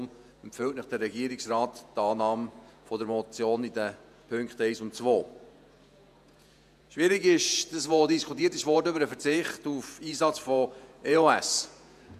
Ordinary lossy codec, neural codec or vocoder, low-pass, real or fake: none; vocoder, 48 kHz, 128 mel bands, Vocos; 14.4 kHz; fake